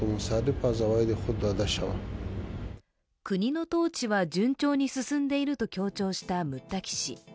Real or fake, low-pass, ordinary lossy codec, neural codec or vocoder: real; none; none; none